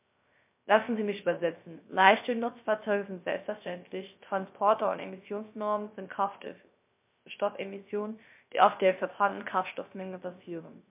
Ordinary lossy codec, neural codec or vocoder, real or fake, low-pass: MP3, 32 kbps; codec, 16 kHz, 0.3 kbps, FocalCodec; fake; 3.6 kHz